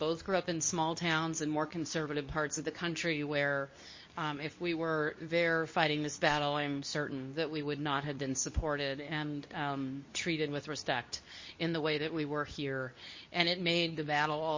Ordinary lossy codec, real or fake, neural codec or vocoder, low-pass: MP3, 32 kbps; fake; codec, 24 kHz, 0.9 kbps, WavTokenizer, medium speech release version 2; 7.2 kHz